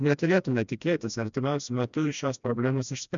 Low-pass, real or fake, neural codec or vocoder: 7.2 kHz; fake; codec, 16 kHz, 1 kbps, FreqCodec, smaller model